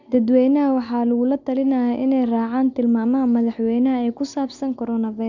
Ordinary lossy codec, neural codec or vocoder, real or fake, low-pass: none; none; real; 7.2 kHz